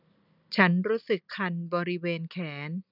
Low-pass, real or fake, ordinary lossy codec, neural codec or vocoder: 5.4 kHz; real; none; none